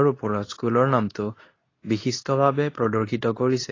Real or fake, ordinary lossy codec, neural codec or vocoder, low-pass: fake; AAC, 32 kbps; codec, 24 kHz, 0.9 kbps, WavTokenizer, medium speech release version 2; 7.2 kHz